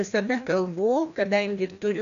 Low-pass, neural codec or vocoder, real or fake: 7.2 kHz; codec, 16 kHz, 1 kbps, FreqCodec, larger model; fake